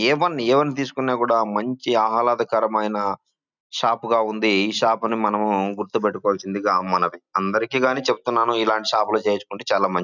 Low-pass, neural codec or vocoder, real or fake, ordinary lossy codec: 7.2 kHz; none; real; none